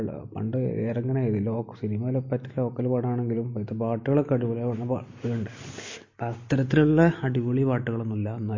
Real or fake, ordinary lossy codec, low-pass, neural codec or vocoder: real; MP3, 32 kbps; 7.2 kHz; none